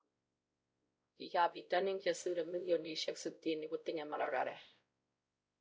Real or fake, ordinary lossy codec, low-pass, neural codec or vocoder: fake; none; none; codec, 16 kHz, 0.5 kbps, X-Codec, WavLM features, trained on Multilingual LibriSpeech